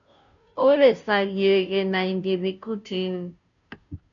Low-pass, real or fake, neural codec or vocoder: 7.2 kHz; fake; codec, 16 kHz, 0.5 kbps, FunCodec, trained on Chinese and English, 25 frames a second